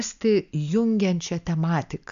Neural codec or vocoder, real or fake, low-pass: none; real; 7.2 kHz